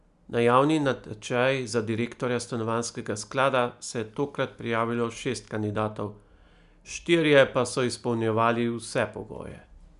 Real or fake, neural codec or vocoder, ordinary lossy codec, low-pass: real; none; none; 10.8 kHz